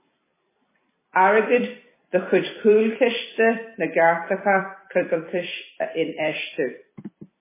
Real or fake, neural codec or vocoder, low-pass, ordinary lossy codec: fake; vocoder, 44.1 kHz, 128 mel bands every 256 samples, BigVGAN v2; 3.6 kHz; MP3, 16 kbps